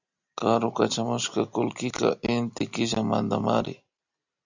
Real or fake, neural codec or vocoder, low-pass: real; none; 7.2 kHz